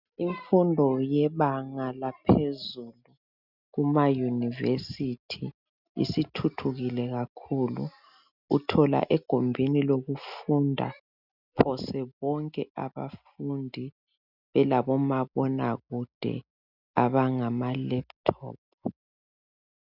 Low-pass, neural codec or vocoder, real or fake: 5.4 kHz; none; real